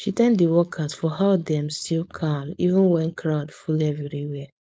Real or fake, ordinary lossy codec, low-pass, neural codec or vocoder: fake; none; none; codec, 16 kHz, 4.8 kbps, FACodec